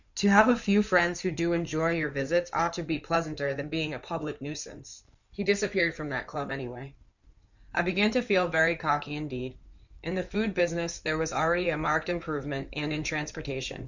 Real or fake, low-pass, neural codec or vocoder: fake; 7.2 kHz; codec, 16 kHz in and 24 kHz out, 2.2 kbps, FireRedTTS-2 codec